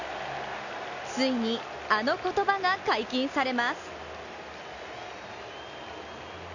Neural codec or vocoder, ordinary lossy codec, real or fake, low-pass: none; none; real; 7.2 kHz